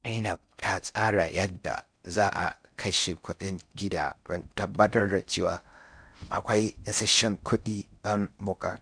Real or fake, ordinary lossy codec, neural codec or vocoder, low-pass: fake; none; codec, 16 kHz in and 24 kHz out, 0.6 kbps, FocalCodec, streaming, 4096 codes; 9.9 kHz